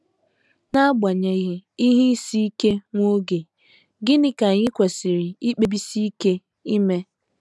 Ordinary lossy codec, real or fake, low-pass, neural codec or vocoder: none; real; none; none